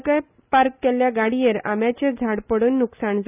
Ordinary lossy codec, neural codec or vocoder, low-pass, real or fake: none; none; 3.6 kHz; real